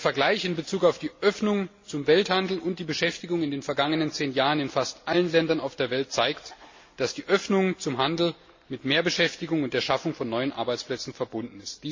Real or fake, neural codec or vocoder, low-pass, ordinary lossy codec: real; none; 7.2 kHz; MP3, 32 kbps